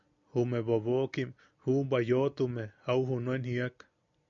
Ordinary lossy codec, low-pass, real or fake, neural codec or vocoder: AAC, 64 kbps; 7.2 kHz; real; none